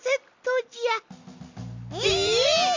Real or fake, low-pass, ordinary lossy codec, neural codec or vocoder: real; 7.2 kHz; MP3, 64 kbps; none